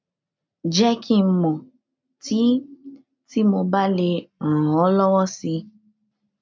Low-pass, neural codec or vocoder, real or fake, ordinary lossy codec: 7.2 kHz; none; real; MP3, 64 kbps